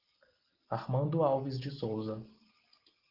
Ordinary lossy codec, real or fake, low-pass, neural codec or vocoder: Opus, 16 kbps; real; 5.4 kHz; none